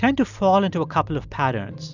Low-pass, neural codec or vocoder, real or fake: 7.2 kHz; none; real